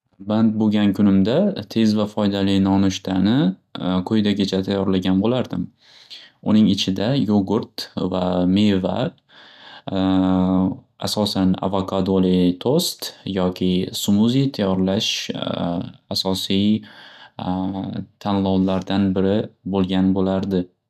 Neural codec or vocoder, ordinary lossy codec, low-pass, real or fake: none; none; 14.4 kHz; real